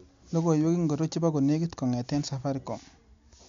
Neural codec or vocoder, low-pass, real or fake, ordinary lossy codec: none; 7.2 kHz; real; MP3, 64 kbps